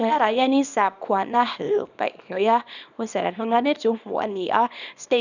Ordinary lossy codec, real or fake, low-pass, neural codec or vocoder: none; fake; 7.2 kHz; codec, 24 kHz, 0.9 kbps, WavTokenizer, small release